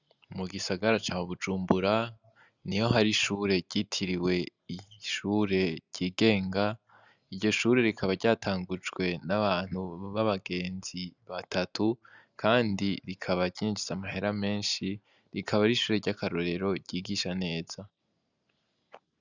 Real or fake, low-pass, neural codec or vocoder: real; 7.2 kHz; none